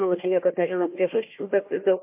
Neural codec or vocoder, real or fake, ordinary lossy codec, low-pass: codec, 16 kHz, 1 kbps, FunCodec, trained on Chinese and English, 50 frames a second; fake; MP3, 24 kbps; 3.6 kHz